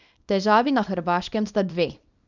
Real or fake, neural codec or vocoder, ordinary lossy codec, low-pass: fake; codec, 24 kHz, 0.9 kbps, WavTokenizer, small release; none; 7.2 kHz